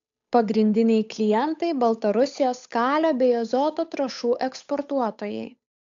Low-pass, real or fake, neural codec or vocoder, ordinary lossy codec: 7.2 kHz; fake; codec, 16 kHz, 8 kbps, FunCodec, trained on Chinese and English, 25 frames a second; AAC, 64 kbps